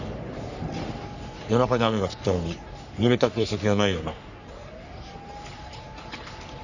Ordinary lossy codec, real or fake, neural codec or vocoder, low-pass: none; fake; codec, 44.1 kHz, 3.4 kbps, Pupu-Codec; 7.2 kHz